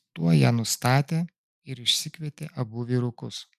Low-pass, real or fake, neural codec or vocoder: 14.4 kHz; real; none